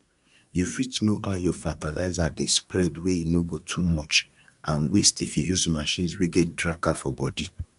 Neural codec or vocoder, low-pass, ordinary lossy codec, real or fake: codec, 24 kHz, 1 kbps, SNAC; 10.8 kHz; none; fake